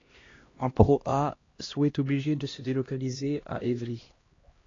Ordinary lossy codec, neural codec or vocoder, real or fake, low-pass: AAC, 32 kbps; codec, 16 kHz, 1 kbps, X-Codec, HuBERT features, trained on LibriSpeech; fake; 7.2 kHz